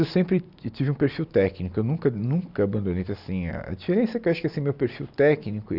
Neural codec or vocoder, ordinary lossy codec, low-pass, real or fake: none; none; 5.4 kHz; real